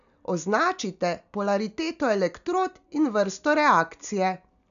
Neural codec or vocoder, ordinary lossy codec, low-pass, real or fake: none; none; 7.2 kHz; real